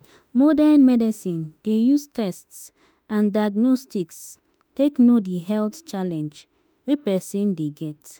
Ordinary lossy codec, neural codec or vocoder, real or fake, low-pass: none; autoencoder, 48 kHz, 32 numbers a frame, DAC-VAE, trained on Japanese speech; fake; none